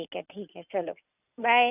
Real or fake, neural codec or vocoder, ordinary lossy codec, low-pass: real; none; none; 3.6 kHz